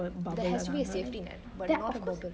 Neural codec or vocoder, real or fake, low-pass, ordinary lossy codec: none; real; none; none